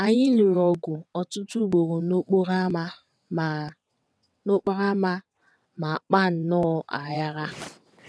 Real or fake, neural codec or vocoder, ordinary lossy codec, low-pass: fake; vocoder, 22.05 kHz, 80 mel bands, WaveNeXt; none; none